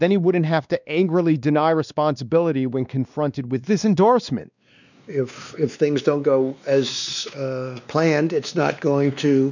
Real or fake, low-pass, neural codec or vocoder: fake; 7.2 kHz; codec, 16 kHz, 2 kbps, X-Codec, WavLM features, trained on Multilingual LibriSpeech